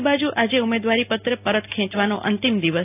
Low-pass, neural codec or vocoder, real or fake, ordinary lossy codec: 3.6 kHz; none; real; AAC, 24 kbps